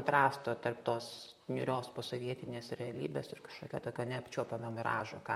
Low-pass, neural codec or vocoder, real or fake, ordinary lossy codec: 19.8 kHz; vocoder, 44.1 kHz, 128 mel bands, Pupu-Vocoder; fake; MP3, 64 kbps